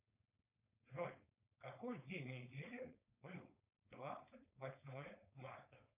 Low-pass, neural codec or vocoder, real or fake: 3.6 kHz; codec, 16 kHz, 4.8 kbps, FACodec; fake